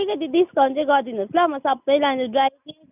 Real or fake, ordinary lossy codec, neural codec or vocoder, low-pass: real; none; none; 3.6 kHz